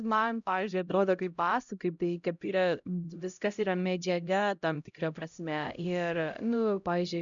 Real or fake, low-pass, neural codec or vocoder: fake; 7.2 kHz; codec, 16 kHz, 0.5 kbps, X-Codec, HuBERT features, trained on LibriSpeech